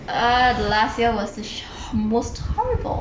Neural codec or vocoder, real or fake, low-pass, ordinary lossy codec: none; real; none; none